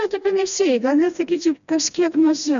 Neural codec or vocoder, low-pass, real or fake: codec, 16 kHz, 1 kbps, FreqCodec, smaller model; 7.2 kHz; fake